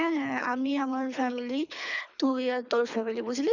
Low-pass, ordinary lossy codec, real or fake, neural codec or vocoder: 7.2 kHz; none; fake; codec, 24 kHz, 3 kbps, HILCodec